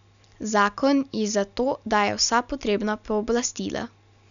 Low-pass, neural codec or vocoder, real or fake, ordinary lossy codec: 7.2 kHz; none; real; none